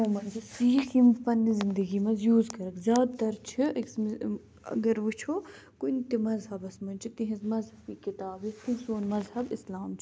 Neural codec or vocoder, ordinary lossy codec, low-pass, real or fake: none; none; none; real